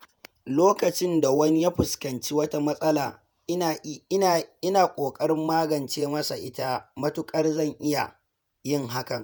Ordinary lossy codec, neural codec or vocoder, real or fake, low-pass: none; vocoder, 48 kHz, 128 mel bands, Vocos; fake; none